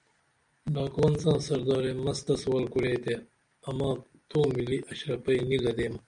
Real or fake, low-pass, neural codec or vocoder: real; 9.9 kHz; none